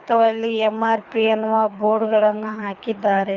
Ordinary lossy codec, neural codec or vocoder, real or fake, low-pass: Opus, 64 kbps; codec, 24 kHz, 3 kbps, HILCodec; fake; 7.2 kHz